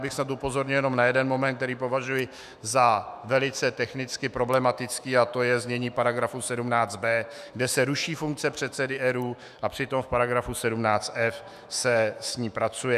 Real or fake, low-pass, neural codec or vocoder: fake; 14.4 kHz; autoencoder, 48 kHz, 128 numbers a frame, DAC-VAE, trained on Japanese speech